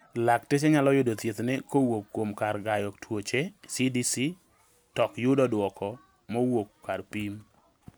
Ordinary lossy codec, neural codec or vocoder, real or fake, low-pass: none; none; real; none